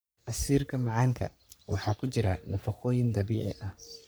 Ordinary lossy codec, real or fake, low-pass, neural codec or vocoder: none; fake; none; codec, 44.1 kHz, 3.4 kbps, Pupu-Codec